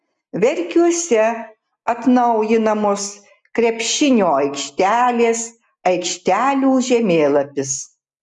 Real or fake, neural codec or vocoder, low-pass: real; none; 10.8 kHz